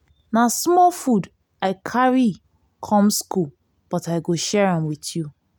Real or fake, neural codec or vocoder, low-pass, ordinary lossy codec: real; none; none; none